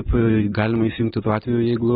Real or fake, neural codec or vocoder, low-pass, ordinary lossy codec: real; none; 7.2 kHz; AAC, 16 kbps